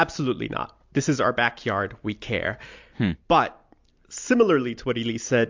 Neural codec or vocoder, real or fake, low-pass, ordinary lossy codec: none; real; 7.2 kHz; MP3, 64 kbps